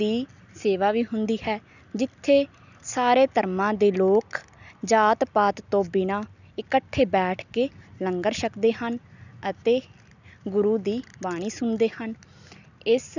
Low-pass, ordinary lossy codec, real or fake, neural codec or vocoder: 7.2 kHz; none; real; none